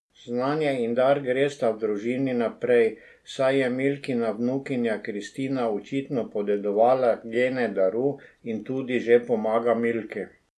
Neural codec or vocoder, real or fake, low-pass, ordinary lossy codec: none; real; none; none